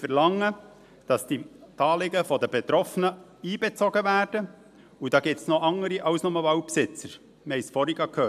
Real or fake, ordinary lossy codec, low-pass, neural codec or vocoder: real; AAC, 96 kbps; 14.4 kHz; none